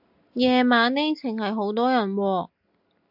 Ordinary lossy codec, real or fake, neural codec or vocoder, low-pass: AAC, 48 kbps; real; none; 5.4 kHz